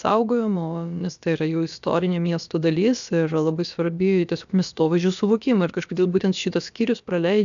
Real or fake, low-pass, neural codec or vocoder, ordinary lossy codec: fake; 7.2 kHz; codec, 16 kHz, about 1 kbps, DyCAST, with the encoder's durations; MP3, 96 kbps